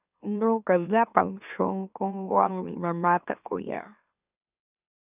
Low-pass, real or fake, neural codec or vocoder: 3.6 kHz; fake; autoencoder, 44.1 kHz, a latent of 192 numbers a frame, MeloTTS